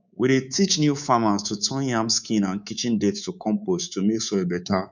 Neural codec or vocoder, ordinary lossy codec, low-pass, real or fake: codec, 24 kHz, 3.1 kbps, DualCodec; none; 7.2 kHz; fake